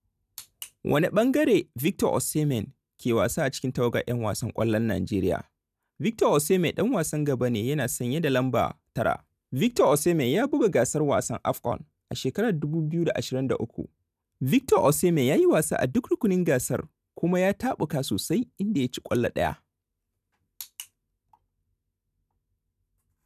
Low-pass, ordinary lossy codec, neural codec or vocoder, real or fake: 14.4 kHz; none; none; real